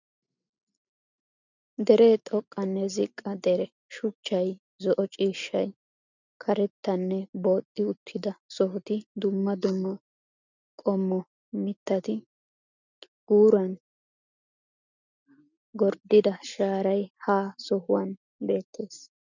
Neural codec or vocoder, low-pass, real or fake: none; 7.2 kHz; real